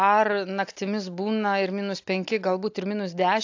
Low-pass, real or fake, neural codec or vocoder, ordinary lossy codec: 7.2 kHz; real; none; AAC, 48 kbps